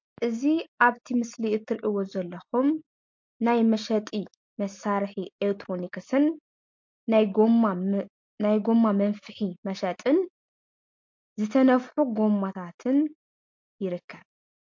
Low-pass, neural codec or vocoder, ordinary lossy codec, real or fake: 7.2 kHz; none; MP3, 48 kbps; real